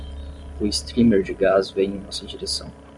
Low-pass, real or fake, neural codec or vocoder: 10.8 kHz; real; none